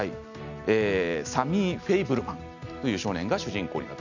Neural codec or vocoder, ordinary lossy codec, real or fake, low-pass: none; none; real; 7.2 kHz